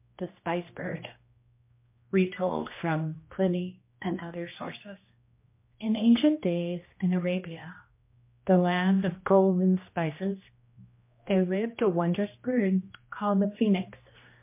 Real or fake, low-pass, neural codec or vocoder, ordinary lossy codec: fake; 3.6 kHz; codec, 16 kHz, 1 kbps, X-Codec, HuBERT features, trained on general audio; MP3, 24 kbps